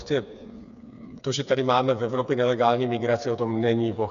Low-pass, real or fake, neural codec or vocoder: 7.2 kHz; fake; codec, 16 kHz, 4 kbps, FreqCodec, smaller model